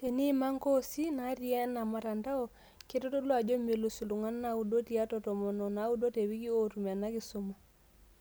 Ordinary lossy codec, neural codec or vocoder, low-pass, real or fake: none; none; none; real